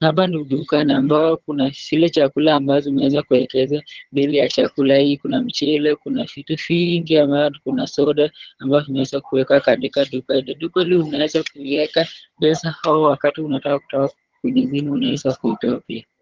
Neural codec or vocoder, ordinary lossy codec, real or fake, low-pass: vocoder, 22.05 kHz, 80 mel bands, HiFi-GAN; Opus, 16 kbps; fake; 7.2 kHz